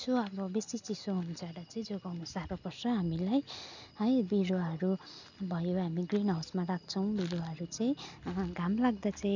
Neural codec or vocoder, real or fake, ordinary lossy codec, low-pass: none; real; none; 7.2 kHz